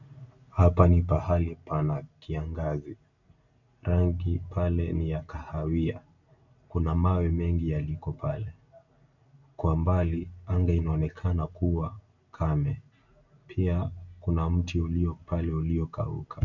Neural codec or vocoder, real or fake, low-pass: none; real; 7.2 kHz